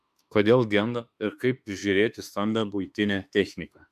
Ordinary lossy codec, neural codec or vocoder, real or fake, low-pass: MP3, 96 kbps; autoencoder, 48 kHz, 32 numbers a frame, DAC-VAE, trained on Japanese speech; fake; 14.4 kHz